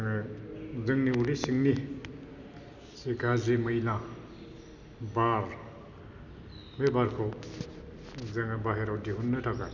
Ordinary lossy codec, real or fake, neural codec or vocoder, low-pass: none; real; none; 7.2 kHz